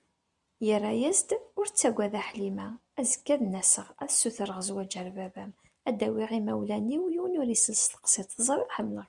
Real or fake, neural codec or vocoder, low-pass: real; none; 10.8 kHz